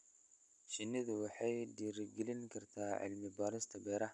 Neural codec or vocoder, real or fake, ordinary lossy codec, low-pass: none; real; none; 10.8 kHz